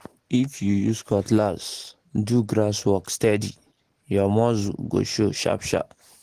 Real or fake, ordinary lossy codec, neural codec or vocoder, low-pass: real; Opus, 16 kbps; none; 14.4 kHz